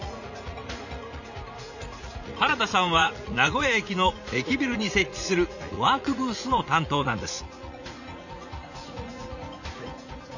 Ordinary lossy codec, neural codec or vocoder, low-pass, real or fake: none; vocoder, 44.1 kHz, 80 mel bands, Vocos; 7.2 kHz; fake